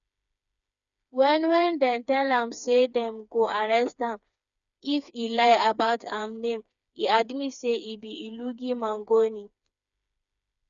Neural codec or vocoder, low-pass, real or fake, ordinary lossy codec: codec, 16 kHz, 4 kbps, FreqCodec, smaller model; 7.2 kHz; fake; none